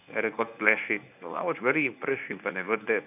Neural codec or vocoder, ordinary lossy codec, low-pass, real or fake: codec, 24 kHz, 0.9 kbps, WavTokenizer, medium speech release version 1; none; 3.6 kHz; fake